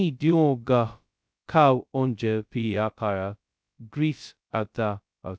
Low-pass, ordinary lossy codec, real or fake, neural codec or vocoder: none; none; fake; codec, 16 kHz, 0.2 kbps, FocalCodec